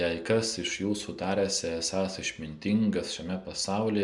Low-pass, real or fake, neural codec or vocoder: 10.8 kHz; real; none